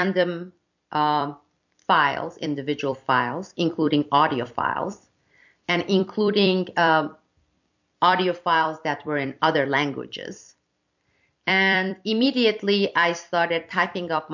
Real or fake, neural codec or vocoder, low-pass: fake; vocoder, 44.1 kHz, 128 mel bands every 256 samples, BigVGAN v2; 7.2 kHz